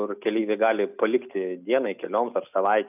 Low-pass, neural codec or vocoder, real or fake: 3.6 kHz; none; real